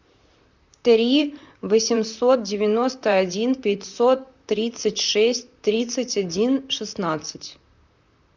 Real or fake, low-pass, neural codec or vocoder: fake; 7.2 kHz; vocoder, 44.1 kHz, 128 mel bands, Pupu-Vocoder